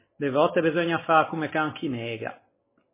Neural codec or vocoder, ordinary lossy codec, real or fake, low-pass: none; MP3, 16 kbps; real; 3.6 kHz